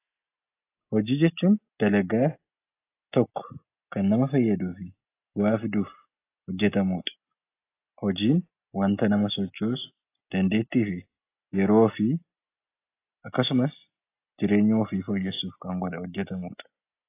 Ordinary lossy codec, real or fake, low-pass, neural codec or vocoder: AAC, 24 kbps; real; 3.6 kHz; none